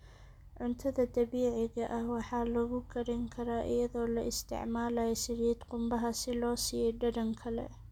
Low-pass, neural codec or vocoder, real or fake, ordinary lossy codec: 19.8 kHz; none; real; none